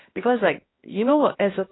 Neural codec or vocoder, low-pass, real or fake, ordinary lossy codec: codec, 16 kHz, 1 kbps, X-Codec, HuBERT features, trained on balanced general audio; 7.2 kHz; fake; AAC, 16 kbps